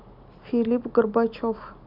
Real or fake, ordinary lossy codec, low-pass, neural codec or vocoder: real; none; 5.4 kHz; none